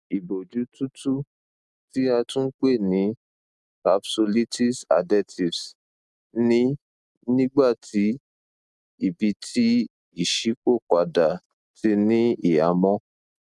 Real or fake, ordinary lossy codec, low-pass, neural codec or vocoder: real; none; none; none